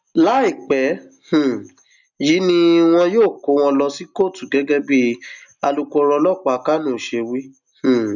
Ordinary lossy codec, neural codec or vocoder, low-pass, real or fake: none; none; 7.2 kHz; real